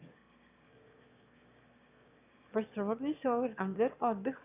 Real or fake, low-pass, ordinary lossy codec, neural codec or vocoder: fake; 3.6 kHz; none; autoencoder, 22.05 kHz, a latent of 192 numbers a frame, VITS, trained on one speaker